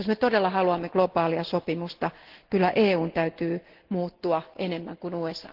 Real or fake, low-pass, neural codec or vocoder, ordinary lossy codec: real; 5.4 kHz; none; Opus, 16 kbps